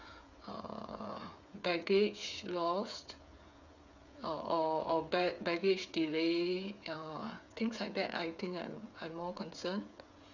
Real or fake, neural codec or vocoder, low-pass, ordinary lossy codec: fake; codec, 16 kHz, 8 kbps, FreqCodec, smaller model; 7.2 kHz; none